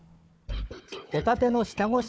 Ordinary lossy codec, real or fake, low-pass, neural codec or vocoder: none; fake; none; codec, 16 kHz, 4 kbps, FunCodec, trained on Chinese and English, 50 frames a second